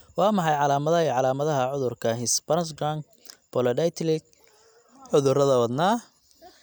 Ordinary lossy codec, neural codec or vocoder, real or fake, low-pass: none; none; real; none